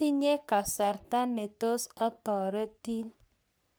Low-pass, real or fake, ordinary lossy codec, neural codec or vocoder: none; fake; none; codec, 44.1 kHz, 3.4 kbps, Pupu-Codec